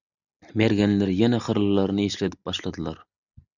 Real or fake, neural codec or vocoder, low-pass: real; none; 7.2 kHz